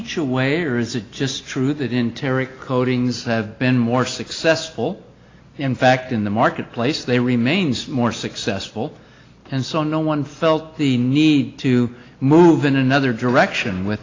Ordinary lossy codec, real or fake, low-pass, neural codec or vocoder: AAC, 32 kbps; real; 7.2 kHz; none